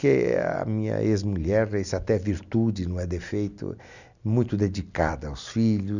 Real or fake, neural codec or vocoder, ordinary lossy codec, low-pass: real; none; none; 7.2 kHz